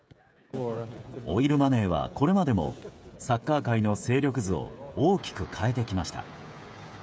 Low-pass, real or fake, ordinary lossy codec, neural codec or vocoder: none; fake; none; codec, 16 kHz, 16 kbps, FreqCodec, smaller model